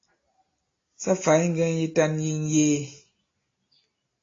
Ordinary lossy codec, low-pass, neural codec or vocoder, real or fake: AAC, 32 kbps; 7.2 kHz; none; real